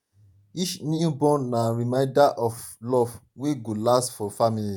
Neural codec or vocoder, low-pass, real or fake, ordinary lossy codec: vocoder, 48 kHz, 128 mel bands, Vocos; none; fake; none